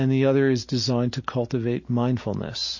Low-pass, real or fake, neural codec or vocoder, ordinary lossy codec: 7.2 kHz; real; none; MP3, 32 kbps